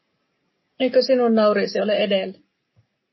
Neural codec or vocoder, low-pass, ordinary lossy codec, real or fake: none; 7.2 kHz; MP3, 24 kbps; real